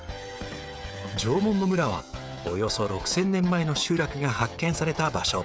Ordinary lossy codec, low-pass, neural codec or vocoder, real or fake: none; none; codec, 16 kHz, 16 kbps, FreqCodec, smaller model; fake